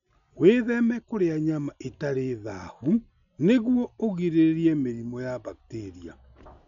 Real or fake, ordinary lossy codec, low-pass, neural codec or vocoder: real; none; 7.2 kHz; none